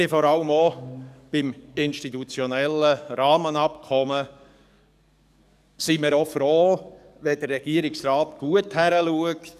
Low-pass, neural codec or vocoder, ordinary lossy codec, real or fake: 14.4 kHz; codec, 44.1 kHz, 7.8 kbps, DAC; none; fake